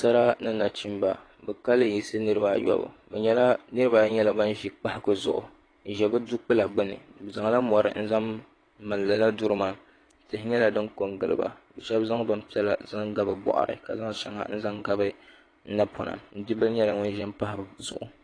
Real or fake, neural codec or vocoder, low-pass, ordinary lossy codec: fake; vocoder, 22.05 kHz, 80 mel bands, WaveNeXt; 9.9 kHz; AAC, 32 kbps